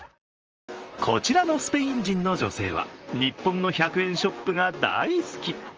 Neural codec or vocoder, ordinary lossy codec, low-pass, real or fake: vocoder, 44.1 kHz, 128 mel bands, Pupu-Vocoder; Opus, 24 kbps; 7.2 kHz; fake